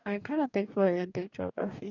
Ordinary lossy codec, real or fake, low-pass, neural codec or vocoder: none; fake; 7.2 kHz; codec, 44.1 kHz, 2.6 kbps, DAC